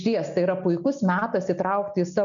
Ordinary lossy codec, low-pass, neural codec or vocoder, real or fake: MP3, 96 kbps; 7.2 kHz; none; real